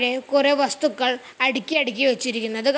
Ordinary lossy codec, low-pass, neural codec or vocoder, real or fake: none; none; none; real